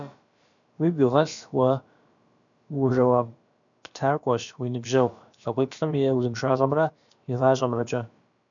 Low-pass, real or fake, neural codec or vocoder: 7.2 kHz; fake; codec, 16 kHz, about 1 kbps, DyCAST, with the encoder's durations